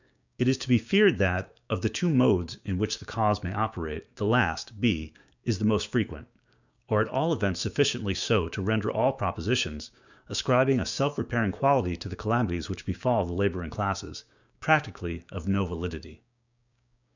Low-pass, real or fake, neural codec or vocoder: 7.2 kHz; fake; codec, 16 kHz, 6 kbps, DAC